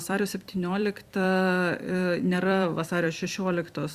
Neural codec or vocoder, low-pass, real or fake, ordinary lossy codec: vocoder, 44.1 kHz, 128 mel bands every 256 samples, BigVGAN v2; 14.4 kHz; fake; Opus, 64 kbps